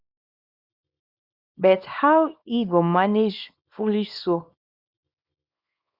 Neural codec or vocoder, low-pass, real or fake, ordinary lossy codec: codec, 24 kHz, 0.9 kbps, WavTokenizer, small release; 5.4 kHz; fake; Opus, 64 kbps